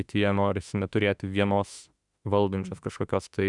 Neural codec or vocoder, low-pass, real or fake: autoencoder, 48 kHz, 32 numbers a frame, DAC-VAE, trained on Japanese speech; 10.8 kHz; fake